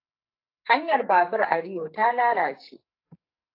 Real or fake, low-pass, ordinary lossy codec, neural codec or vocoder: fake; 5.4 kHz; AAC, 32 kbps; codec, 44.1 kHz, 2.6 kbps, SNAC